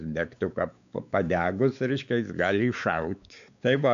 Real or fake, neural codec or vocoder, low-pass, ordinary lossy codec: fake; codec, 16 kHz, 4 kbps, X-Codec, WavLM features, trained on Multilingual LibriSpeech; 7.2 kHz; AAC, 96 kbps